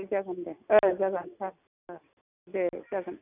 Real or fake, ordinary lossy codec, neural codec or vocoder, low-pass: real; none; none; 3.6 kHz